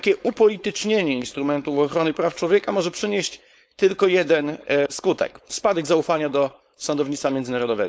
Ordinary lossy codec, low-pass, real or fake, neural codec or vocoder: none; none; fake; codec, 16 kHz, 4.8 kbps, FACodec